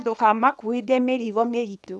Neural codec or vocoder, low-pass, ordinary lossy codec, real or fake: codec, 24 kHz, 0.9 kbps, WavTokenizer, small release; none; none; fake